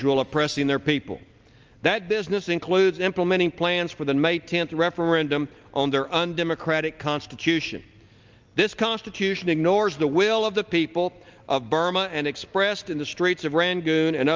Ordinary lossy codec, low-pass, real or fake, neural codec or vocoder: Opus, 24 kbps; 7.2 kHz; real; none